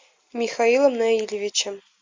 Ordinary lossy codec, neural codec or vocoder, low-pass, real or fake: MP3, 64 kbps; none; 7.2 kHz; real